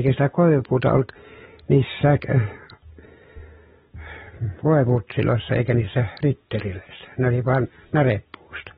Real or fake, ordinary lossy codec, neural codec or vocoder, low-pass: real; AAC, 16 kbps; none; 19.8 kHz